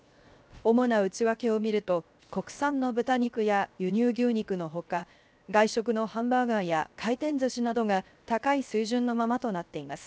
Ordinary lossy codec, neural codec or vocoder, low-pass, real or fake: none; codec, 16 kHz, 0.7 kbps, FocalCodec; none; fake